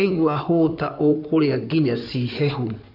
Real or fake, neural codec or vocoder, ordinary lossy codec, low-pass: fake; codec, 16 kHz in and 24 kHz out, 2.2 kbps, FireRedTTS-2 codec; none; 5.4 kHz